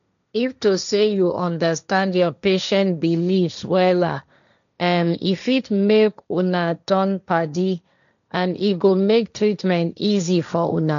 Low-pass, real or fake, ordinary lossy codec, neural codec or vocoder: 7.2 kHz; fake; none; codec, 16 kHz, 1.1 kbps, Voila-Tokenizer